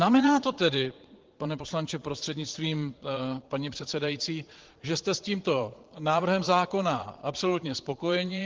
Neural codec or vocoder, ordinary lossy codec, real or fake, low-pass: vocoder, 22.05 kHz, 80 mel bands, Vocos; Opus, 16 kbps; fake; 7.2 kHz